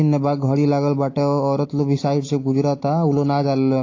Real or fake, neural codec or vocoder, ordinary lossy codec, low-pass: real; none; AAC, 32 kbps; 7.2 kHz